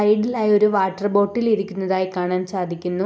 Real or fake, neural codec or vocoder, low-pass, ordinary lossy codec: real; none; none; none